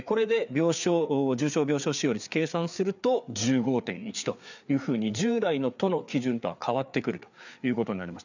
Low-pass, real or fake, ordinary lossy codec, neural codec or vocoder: 7.2 kHz; fake; none; codec, 16 kHz, 4 kbps, FreqCodec, larger model